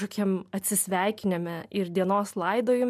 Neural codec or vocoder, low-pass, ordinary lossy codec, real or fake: none; 14.4 kHz; MP3, 96 kbps; real